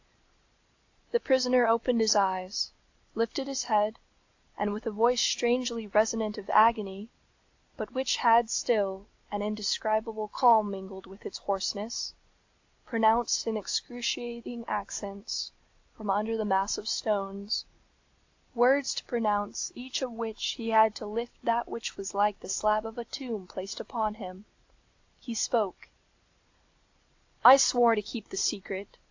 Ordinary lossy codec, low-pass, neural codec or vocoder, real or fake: AAC, 48 kbps; 7.2 kHz; none; real